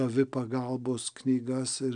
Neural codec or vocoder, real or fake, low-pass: none; real; 9.9 kHz